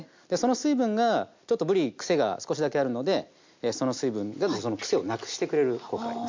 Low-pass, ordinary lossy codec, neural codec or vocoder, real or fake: 7.2 kHz; MP3, 64 kbps; none; real